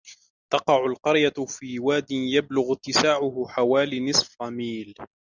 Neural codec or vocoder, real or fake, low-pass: none; real; 7.2 kHz